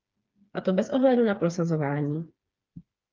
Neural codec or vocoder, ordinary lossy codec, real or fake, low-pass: codec, 16 kHz, 4 kbps, FreqCodec, smaller model; Opus, 24 kbps; fake; 7.2 kHz